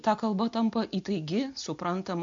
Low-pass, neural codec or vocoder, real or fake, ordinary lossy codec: 7.2 kHz; none; real; MP3, 48 kbps